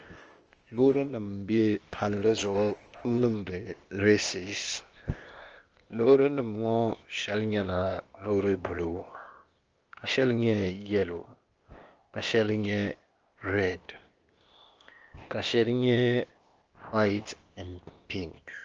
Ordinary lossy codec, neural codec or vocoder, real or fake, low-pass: Opus, 24 kbps; codec, 16 kHz, 0.8 kbps, ZipCodec; fake; 7.2 kHz